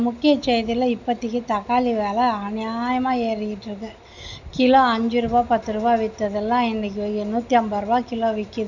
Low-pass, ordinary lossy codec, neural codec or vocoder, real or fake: 7.2 kHz; none; none; real